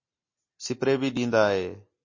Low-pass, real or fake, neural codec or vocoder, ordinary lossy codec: 7.2 kHz; real; none; MP3, 32 kbps